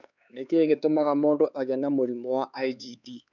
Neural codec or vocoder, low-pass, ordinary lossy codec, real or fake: codec, 16 kHz, 4 kbps, X-Codec, HuBERT features, trained on LibriSpeech; 7.2 kHz; none; fake